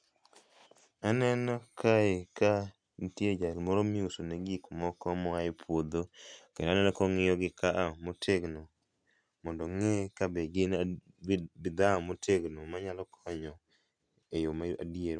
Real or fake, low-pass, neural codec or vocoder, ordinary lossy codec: real; 9.9 kHz; none; none